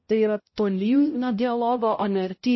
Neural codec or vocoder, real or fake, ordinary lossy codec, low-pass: codec, 16 kHz, 0.5 kbps, X-Codec, HuBERT features, trained on balanced general audio; fake; MP3, 24 kbps; 7.2 kHz